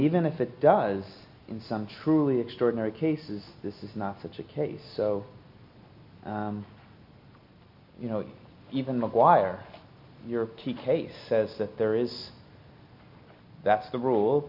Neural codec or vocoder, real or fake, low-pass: none; real; 5.4 kHz